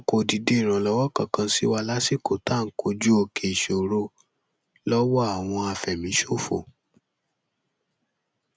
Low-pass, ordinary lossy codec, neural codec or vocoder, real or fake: none; none; none; real